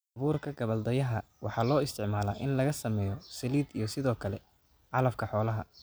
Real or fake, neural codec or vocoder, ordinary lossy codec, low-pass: real; none; none; none